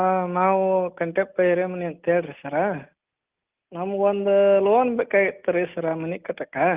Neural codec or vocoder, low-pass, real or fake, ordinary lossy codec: none; 3.6 kHz; real; Opus, 32 kbps